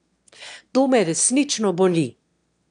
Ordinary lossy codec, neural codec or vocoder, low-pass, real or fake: none; autoencoder, 22.05 kHz, a latent of 192 numbers a frame, VITS, trained on one speaker; 9.9 kHz; fake